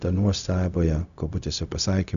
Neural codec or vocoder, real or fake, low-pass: codec, 16 kHz, 0.4 kbps, LongCat-Audio-Codec; fake; 7.2 kHz